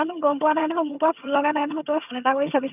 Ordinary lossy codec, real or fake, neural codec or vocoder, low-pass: none; fake; vocoder, 22.05 kHz, 80 mel bands, HiFi-GAN; 3.6 kHz